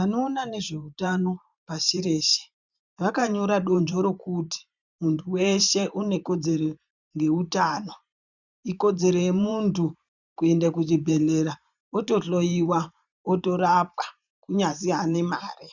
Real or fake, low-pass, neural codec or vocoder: fake; 7.2 kHz; vocoder, 44.1 kHz, 128 mel bands every 512 samples, BigVGAN v2